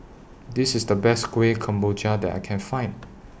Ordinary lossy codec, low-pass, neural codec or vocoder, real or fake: none; none; none; real